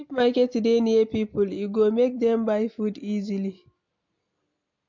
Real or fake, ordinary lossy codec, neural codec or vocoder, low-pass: real; MP3, 48 kbps; none; 7.2 kHz